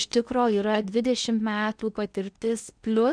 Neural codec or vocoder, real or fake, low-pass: codec, 16 kHz in and 24 kHz out, 0.8 kbps, FocalCodec, streaming, 65536 codes; fake; 9.9 kHz